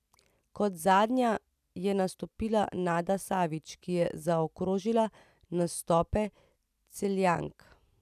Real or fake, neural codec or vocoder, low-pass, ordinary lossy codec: real; none; 14.4 kHz; none